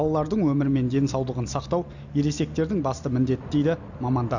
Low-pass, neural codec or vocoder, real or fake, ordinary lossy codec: 7.2 kHz; none; real; none